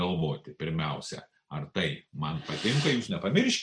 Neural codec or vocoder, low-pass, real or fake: none; 9.9 kHz; real